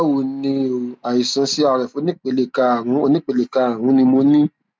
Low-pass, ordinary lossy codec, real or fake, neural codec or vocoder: none; none; real; none